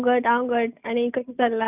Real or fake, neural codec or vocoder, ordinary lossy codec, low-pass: real; none; none; 3.6 kHz